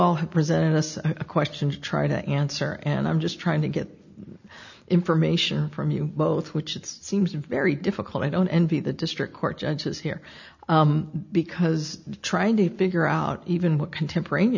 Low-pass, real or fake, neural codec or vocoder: 7.2 kHz; real; none